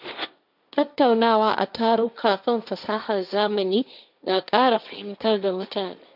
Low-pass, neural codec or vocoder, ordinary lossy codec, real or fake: 5.4 kHz; codec, 16 kHz, 1.1 kbps, Voila-Tokenizer; none; fake